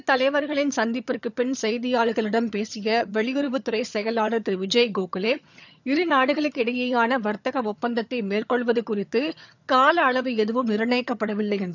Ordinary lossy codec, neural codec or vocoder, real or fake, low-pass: none; vocoder, 22.05 kHz, 80 mel bands, HiFi-GAN; fake; 7.2 kHz